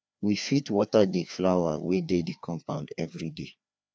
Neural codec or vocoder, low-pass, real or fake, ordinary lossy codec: codec, 16 kHz, 2 kbps, FreqCodec, larger model; none; fake; none